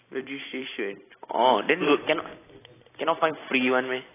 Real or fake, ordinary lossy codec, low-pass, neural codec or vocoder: fake; AAC, 16 kbps; 3.6 kHz; vocoder, 44.1 kHz, 128 mel bands every 256 samples, BigVGAN v2